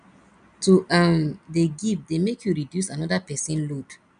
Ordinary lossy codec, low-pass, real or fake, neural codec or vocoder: none; 9.9 kHz; real; none